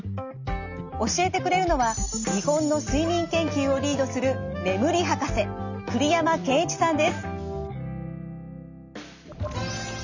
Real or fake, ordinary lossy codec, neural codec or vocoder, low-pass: real; none; none; 7.2 kHz